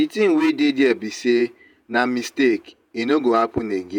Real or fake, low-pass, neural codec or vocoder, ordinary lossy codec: fake; 19.8 kHz; vocoder, 48 kHz, 128 mel bands, Vocos; none